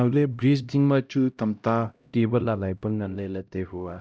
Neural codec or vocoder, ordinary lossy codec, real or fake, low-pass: codec, 16 kHz, 0.5 kbps, X-Codec, HuBERT features, trained on LibriSpeech; none; fake; none